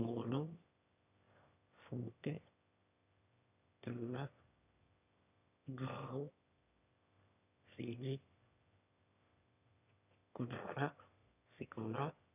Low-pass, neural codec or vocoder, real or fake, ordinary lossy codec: 3.6 kHz; autoencoder, 22.05 kHz, a latent of 192 numbers a frame, VITS, trained on one speaker; fake; none